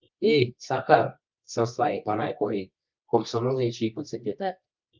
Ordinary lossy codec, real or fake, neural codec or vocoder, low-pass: Opus, 32 kbps; fake; codec, 24 kHz, 0.9 kbps, WavTokenizer, medium music audio release; 7.2 kHz